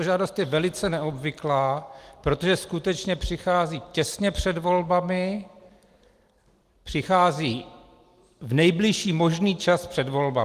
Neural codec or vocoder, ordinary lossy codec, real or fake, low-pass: none; Opus, 24 kbps; real; 14.4 kHz